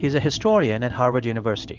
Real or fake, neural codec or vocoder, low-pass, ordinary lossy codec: fake; codec, 16 kHz in and 24 kHz out, 1 kbps, XY-Tokenizer; 7.2 kHz; Opus, 32 kbps